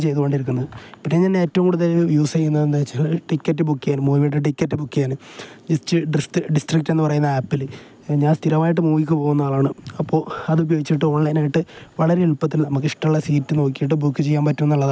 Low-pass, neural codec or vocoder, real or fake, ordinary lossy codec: none; none; real; none